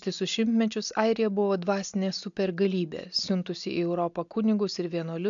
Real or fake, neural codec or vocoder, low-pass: real; none; 7.2 kHz